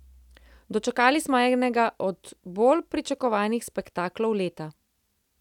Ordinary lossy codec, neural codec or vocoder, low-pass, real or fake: none; none; 19.8 kHz; real